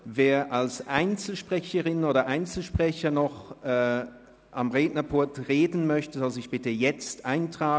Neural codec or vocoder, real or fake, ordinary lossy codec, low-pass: none; real; none; none